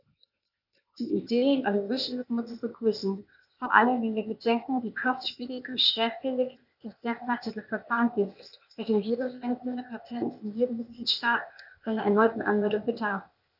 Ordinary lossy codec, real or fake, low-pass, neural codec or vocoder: none; fake; 5.4 kHz; codec, 16 kHz, 0.8 kbps, ZipCodec